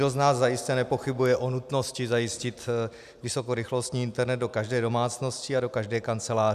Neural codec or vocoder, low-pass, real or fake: none; 14.4 kHz; real